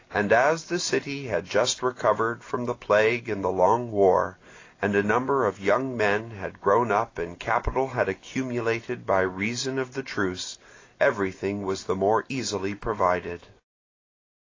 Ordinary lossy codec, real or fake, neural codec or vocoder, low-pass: AAC, 32 kbps; real; none; 7.2 kHz